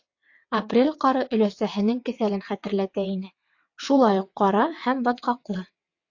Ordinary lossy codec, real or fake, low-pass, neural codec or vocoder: AAC, 48 kbps; fake; 7.2 kHz; vocoder, 22.05 kHz, 80 mel bands, WaveNeXt